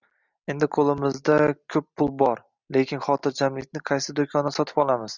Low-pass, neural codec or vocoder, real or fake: 7.2 kHz; none; real